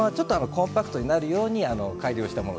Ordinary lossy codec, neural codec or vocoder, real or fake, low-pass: none; none; real; none